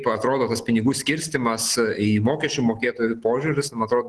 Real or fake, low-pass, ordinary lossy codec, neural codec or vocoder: real; 10.8 kHz; Opus, 16 kbps; none